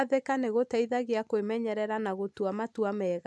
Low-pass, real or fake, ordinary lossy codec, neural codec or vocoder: none; real; none; none